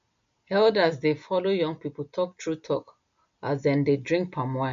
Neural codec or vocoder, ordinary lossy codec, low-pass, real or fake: none; MP3, 48 kbps; 7.2 kHz; real